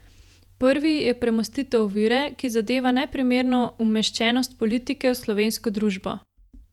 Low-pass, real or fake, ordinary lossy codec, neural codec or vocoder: 19.8 kHz; fake; none; vocoder, 48 kHz, 128 mel bands, Vocos